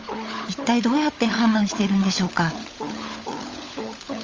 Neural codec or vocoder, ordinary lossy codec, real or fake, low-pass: codec, 16 kHz, 16 kbps, FunCodec, trained on LibriTTS, 50 frames a second; Opus, 32 kbps; fake; 7.2 kHz